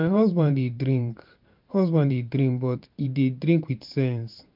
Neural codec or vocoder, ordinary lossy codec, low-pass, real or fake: vocoder, 44.1 kHz, 128 mel bands every 512 samples, BigVGAN v2; MP3, 48 kbps; 5.4 kHz; fake